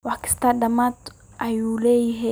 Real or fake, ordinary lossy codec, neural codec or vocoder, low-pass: real; none; none; none